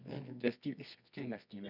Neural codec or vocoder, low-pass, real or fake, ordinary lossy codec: codec, 24 kHz, 0.9 kbps, WavTokenizer, medium music audio release; 5.4 kHz; fake; none